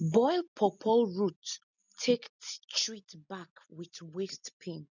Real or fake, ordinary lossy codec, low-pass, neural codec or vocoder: real; none; 7.2 kHz; none